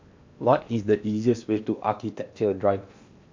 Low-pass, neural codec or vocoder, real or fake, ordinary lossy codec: 7.2 kHz; codec, 16 kHz in and 24 kHz out, 0.8 kbps, FocalCodec, streaming, 65536 codes; fake; MP3, 64 kbps